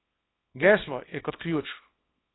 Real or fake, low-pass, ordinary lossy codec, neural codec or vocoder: fake; 7.2 kHz; AAC, 16 kbps; codec, 24 kHz, 0.9 kbps, WavTokenizer, small release